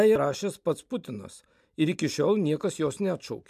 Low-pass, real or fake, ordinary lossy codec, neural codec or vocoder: 14.4 kHz; real; MP3, 96 kbps; none